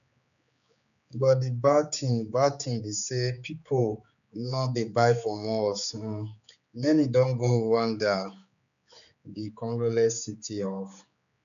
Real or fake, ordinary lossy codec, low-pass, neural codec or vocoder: fake; none; 7.2 kHz; codec, 16 kHz, 4 kbps, X-Codec, HuBERT features, trained on general audio